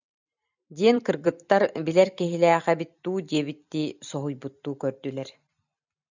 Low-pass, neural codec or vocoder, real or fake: 7.2 kHz; vocoder, 44.1 kHz, 128 mel bands every 256 samples, BigVGAN v2; fake